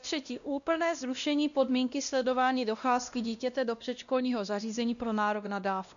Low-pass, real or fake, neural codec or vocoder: 7.2 kHz; fake; codec, 16 kHz, 1 kbps, X-Codec, WavLM features, trained on Multilingual LibriSpeech